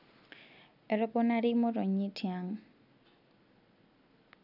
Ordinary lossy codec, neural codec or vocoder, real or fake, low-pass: none; none; real; 5.4 kHz